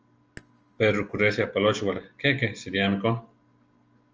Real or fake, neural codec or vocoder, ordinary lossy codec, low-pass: real; none; Opus, 24 kbps; 7.2 kHz